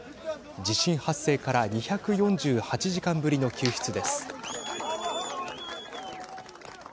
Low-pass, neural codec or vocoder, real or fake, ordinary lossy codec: none; none; real; none